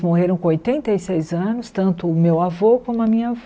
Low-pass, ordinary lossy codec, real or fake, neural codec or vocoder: none; none; real; none